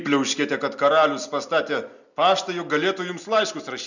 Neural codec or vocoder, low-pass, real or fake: none; 7.2 kHz; real